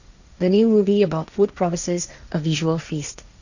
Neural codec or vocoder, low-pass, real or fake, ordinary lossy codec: codec, 16 kHz, 1.1 kbps, Voila-Tokenizer; 7.2 kHz; fake; none